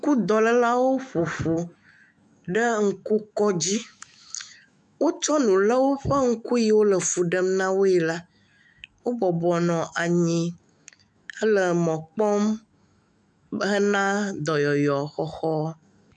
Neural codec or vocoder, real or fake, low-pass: autoencoder, 48 kHz, 128 numbers a frame, DAC-VAE, trained on Japanese speech; fake; 10.8 kHz